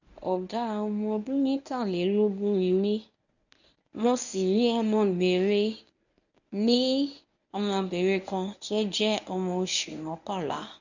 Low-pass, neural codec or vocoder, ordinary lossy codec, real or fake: 7.2 kHz; codec, 24 kHz, 0.9 kbps, WavTokenizer, medium speech release version 1; none; fake